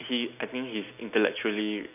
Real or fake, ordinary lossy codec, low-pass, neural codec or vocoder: real; none; 3.6 kHz; none